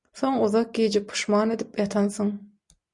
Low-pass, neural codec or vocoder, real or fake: 10.8 kHz; none; real